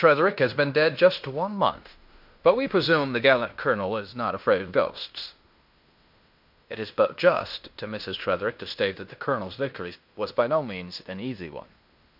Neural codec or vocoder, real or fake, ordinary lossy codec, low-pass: codec, 16 kHz in and 24 kHz out, 0.9 kbps, LongCat-Audio-Codec, fine tuned four codebook decoder; fake; MP3, 48 kbps; 5.4 kHz